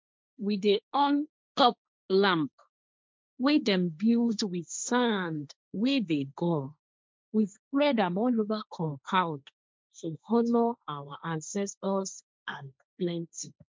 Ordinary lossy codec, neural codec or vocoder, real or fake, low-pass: none; codec, 16 kHz, 1.1 kbps, Voila-Tokenizer; fake; none